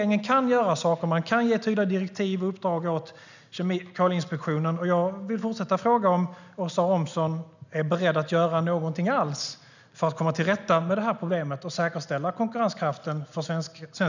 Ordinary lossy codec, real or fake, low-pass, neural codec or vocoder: none; real; 7.2 kHz; none